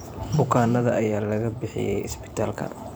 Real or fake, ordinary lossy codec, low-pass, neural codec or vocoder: fake; none; none; vocoder, 44.1 kHz, 128 mel bands every 512 samples, BigVGAN v2